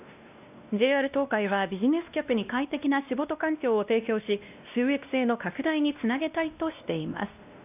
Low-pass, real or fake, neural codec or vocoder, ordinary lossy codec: 3.6 kHz; fake; codec, 16 kHz, 1 kbps, X-Codec, WavLM features, trained on Multilingual LibriSpeech; none